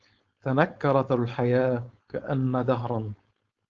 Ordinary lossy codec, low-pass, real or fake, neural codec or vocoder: Opus, 24 kbps; 7.2 kHz; fake; codec, 16 kHz, 4.8 kbps, FACodec